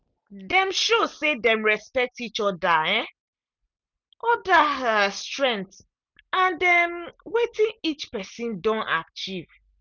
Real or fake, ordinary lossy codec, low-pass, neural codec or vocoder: real; none; 7.2 kHz; none